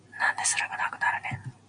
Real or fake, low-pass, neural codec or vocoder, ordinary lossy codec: real; 9.9 kHz; none; Opus, 64 kbps